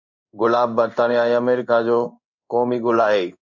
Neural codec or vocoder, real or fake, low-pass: codec, 16 kHz in and 24 kHz out, 1 kbps, XY-Tokenizer; fake; 7.2 kHz